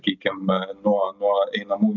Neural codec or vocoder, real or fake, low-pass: none; real; 7.2 kHz